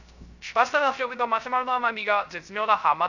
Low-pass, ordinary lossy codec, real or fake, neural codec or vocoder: 7.2 kHz; none; fake; codec, 16 kHz, 0.3 kbps, FocalCodec